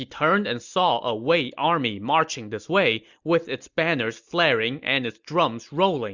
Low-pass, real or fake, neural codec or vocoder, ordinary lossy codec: 7.2 kHz; real; none; Opus, 64 kbps